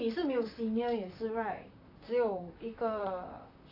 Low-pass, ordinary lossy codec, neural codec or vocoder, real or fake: 5.4 kHz; AAC, 32 kbps; vocoder, 44.1 kHz, 128 mel bands, Pupu-Vocoder; fake